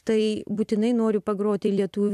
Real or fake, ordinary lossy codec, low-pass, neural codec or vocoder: fake; AAC, 96 kbps; 14.4 kHz; vocoder, 44.1 kHz, 128 mel bands every 256 samples, BigVGAN v2